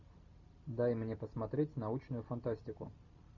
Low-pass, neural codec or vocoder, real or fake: 7.2 kHz; none; real